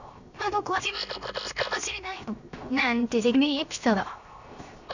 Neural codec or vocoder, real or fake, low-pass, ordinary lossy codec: codec, 16 kHz, 0.7 kbps, FocalCodec; fake; 7.2 kHz; none